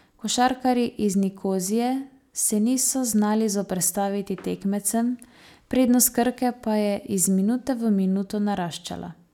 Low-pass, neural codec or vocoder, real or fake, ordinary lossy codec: 19.8 kHz; none; real; none